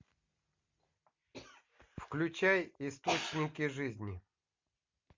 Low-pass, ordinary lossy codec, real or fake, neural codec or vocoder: 7.2 kHz; MP3, 48 kbps; real; none